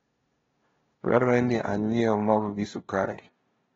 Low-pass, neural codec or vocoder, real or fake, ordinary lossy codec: 7.2 kHz; codec, 16 kHz, 0.5 kbps, FunCodec, trained on LibriTTS, 25 frames a second; fake; AAC, 24 kbps